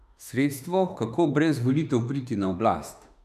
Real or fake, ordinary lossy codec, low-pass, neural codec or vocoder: fake; none; 14.4 kHz; autoencoder, 48 kHz, 32 numbers a frame, DAC-VAE, trained on Japanese speech